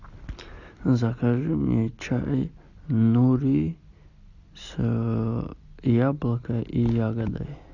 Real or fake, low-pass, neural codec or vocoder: real; 7.2 kHz; none